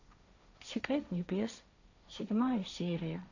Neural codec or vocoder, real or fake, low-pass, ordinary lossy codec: codec, 16 kHz, 1.1 kbps, Voila-Tokenizer; fake; 7.2 kHz; none